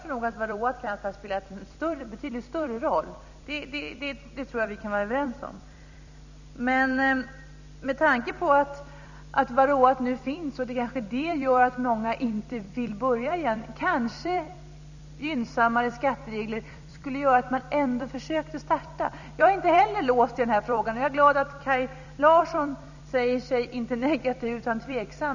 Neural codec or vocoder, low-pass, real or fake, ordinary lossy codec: vocoder, 44.1 kHz, 128 mel bands every 256 samples, BigVGAN v2; 7.2 kHz; fake; none